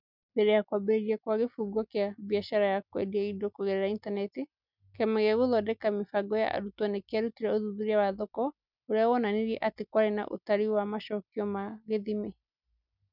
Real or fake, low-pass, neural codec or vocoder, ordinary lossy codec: real; 5.4 kHz; none; none